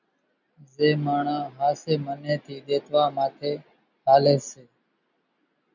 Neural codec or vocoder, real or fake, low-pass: none; real; 7.2 kHz